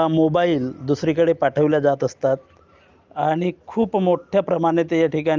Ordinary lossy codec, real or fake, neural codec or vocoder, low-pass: Opus, 32 kbps; real; none; 7.2 kHz